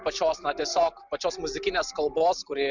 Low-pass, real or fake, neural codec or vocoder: 7.2 kHz; real; none